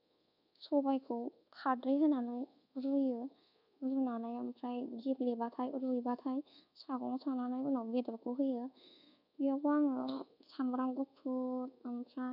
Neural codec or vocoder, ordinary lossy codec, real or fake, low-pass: codec, 24 kHz, 1.2 kbps, DualCodec; none; fake; 5.4 kHz